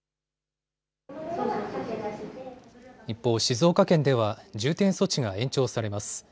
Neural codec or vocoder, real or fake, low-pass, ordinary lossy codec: none; real; none; none